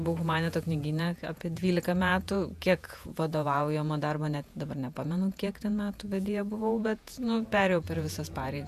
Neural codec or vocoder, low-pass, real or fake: vocoder, 48 kHz, 128 mel bands, Vocos; 14.4 kHz; fake